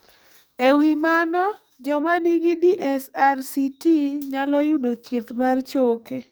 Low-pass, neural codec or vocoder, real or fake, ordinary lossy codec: none; codec, 44.1 kHz, 2.6 kbps, SNAC; fake; none